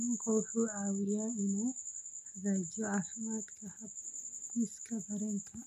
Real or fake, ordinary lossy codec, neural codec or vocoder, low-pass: real; none; none; 14.4 kHz